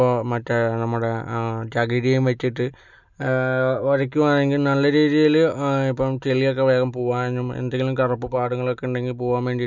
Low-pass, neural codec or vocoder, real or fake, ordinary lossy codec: 7.2 kHz; none; real; none